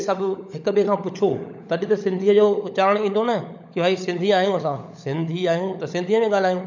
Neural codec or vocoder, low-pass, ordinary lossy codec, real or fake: codec, 16 kHz, 16 kbps, FunCodec, trained on LibriTTS, 50 frames a second; 7.2 kHz; none; fake